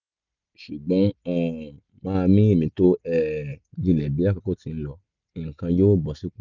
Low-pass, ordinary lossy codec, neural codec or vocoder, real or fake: 7.2 kHz; none; none; real